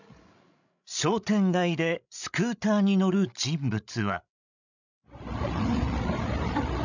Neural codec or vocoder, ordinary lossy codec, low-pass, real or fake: codec, 16 kHz, 16 kbps, FreqCodec, larger model; none; 7.2 kHz; fake